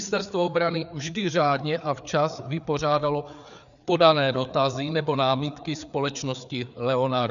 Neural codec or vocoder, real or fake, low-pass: codec, 16 kHz, 4 kbps, FreqCodec, larger model; fake; 7.2 kHz